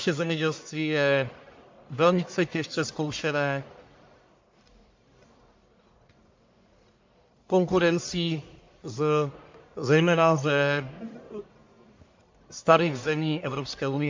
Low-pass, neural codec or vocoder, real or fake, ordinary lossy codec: 7.2 kHz; codec, 44.1 kHz, 1.7 kbps, Pupu-Codec; fake; MP3, 48 kbps